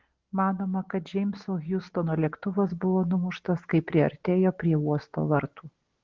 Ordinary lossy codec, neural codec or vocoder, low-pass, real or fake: Opus, 16 kbps; none; 7.2 kHz; real